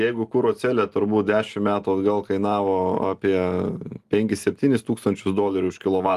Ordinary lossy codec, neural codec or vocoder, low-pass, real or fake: Opus, 24 kbps; none; 14.4 kHz; real